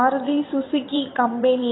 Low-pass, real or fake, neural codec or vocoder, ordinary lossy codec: 7.2 kHz; fake; vocoder, 22.05 kHz, 80 mel bands, WaveNeXt; AAC, 16 kbps